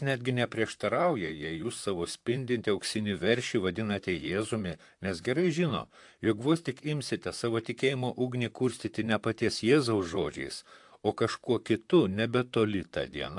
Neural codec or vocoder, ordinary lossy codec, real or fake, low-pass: vocoder, 44.1 kHz, 128 mel bands, Pupu-Vocoder; AAC, 64 kbps; fake; 10.8 kHz